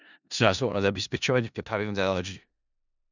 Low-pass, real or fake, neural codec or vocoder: 7.2 kHz; fake; codec, 16 kHz in and 24 kHz out, 0.4 kbps, LongCat-Audio-Codec, four codebook decoder